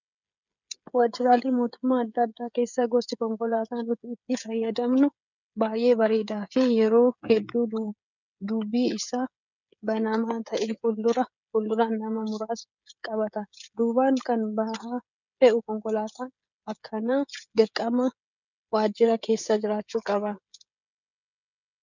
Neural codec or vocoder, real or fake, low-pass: codec, 16 kHz, 16 kbps, FreqCodec, smaller model; fake; 7.2 kHz